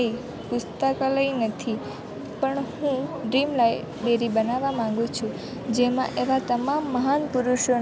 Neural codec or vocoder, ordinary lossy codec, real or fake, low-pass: none; none; real; none